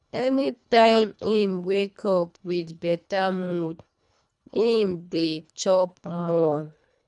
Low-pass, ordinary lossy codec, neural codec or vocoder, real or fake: 10.8 kHz; none; codec, 24 kHz, 1.5 kbps, HILCodec; fake